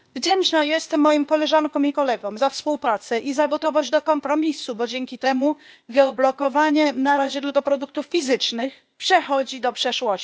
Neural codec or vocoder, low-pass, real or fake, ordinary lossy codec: codec, 16 kHz, 0.8 kbps, ZipCodec; none; fake; none